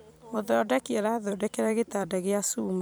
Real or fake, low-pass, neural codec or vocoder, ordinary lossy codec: fake; none; vocoder, 44.1 kHz, 128 mel bands every 256 samples, BigVGAN v2; none